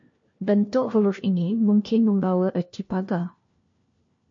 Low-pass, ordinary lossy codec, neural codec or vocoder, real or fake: 7.2 kHz; MP3, 48 kbps; codec, 16 kHz, 1 kbps, FunCodec, trained on LibriTTS, 50 frames a second; fake